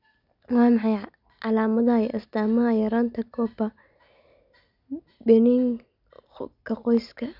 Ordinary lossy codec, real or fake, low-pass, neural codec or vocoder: none; real; 5.4 kHz; none